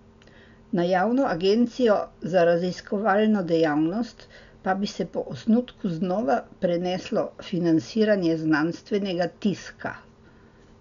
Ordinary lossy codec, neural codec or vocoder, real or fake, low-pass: none; none; real; 7.2 kHz